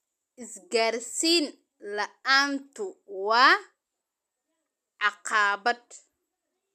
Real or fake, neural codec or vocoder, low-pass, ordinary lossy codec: real; none; 14.4 kHz; none